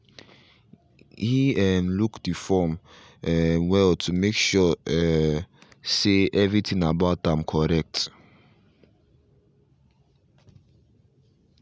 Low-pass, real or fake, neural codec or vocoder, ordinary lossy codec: none; real; none; none